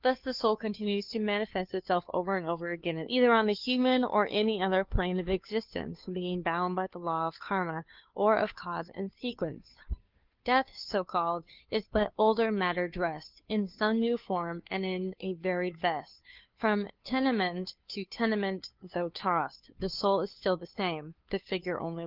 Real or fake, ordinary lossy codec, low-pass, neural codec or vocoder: fake; Opus, 32 kbps; 5.4 kHz; codec, 16 kHz, 2 kbps, FunCodec, trained on Chinese and English, 25 frames a second